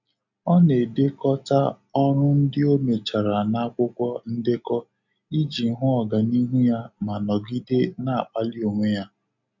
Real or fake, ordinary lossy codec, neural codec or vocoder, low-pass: real; none; none; 7.2 kHz